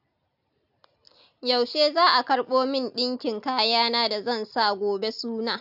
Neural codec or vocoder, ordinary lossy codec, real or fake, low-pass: none; AAC, 48 kbps; real; 5.4 kHz